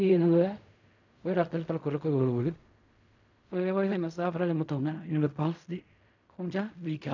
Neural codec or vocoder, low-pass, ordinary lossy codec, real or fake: codec, 16 kHz in and 24 kHz out, 0.4 kbps, LongCat-Audio-Codec, fine tuned four codebook decoder; 7.2 kHz; none; fake